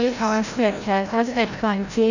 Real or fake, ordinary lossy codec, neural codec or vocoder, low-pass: fake; none; codec, 16 kHz, 0.5 kbps, FreqCodec, larger model; 7.2 kHz